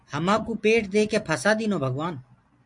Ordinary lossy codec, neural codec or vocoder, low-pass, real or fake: MP3, 64 kbps; none; 10.8 kHz; real